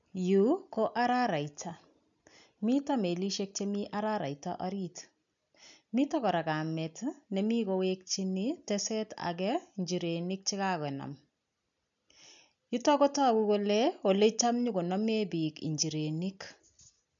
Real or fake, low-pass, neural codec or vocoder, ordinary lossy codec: real; 7.2 kHz; none; none